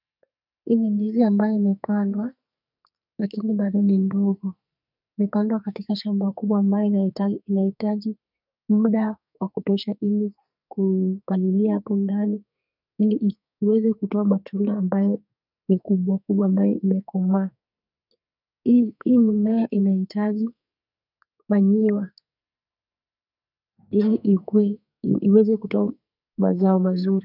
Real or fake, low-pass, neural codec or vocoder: fake; 5.4 kHz; codec, 44.1 kHz, 2.6 kbps, SNAC